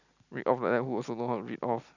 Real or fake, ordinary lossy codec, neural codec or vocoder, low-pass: real; none; none; 7.2 kHz